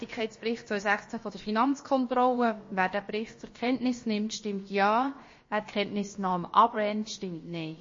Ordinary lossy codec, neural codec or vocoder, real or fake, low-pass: MP3, 32 kbps; codec, 16 kHz, about 1 kbps, DyCAST, with the encoder's durations; fake; 7.2 kHz